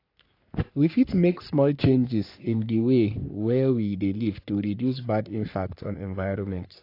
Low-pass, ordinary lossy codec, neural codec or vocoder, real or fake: 5.4 kHz; AAC, 32 kbps; codec, 44.1 kHz, 3.4 kbps, Pupu-Codec; fake